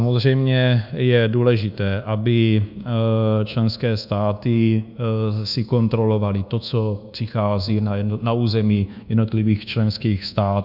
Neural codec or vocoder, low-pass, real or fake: codec, 24 kHz, 1.2 kbps, DualCodec; 5.4 kHz; fake